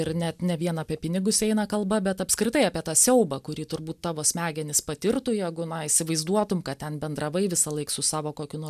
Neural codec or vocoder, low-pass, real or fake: none; 14.4 kHz; real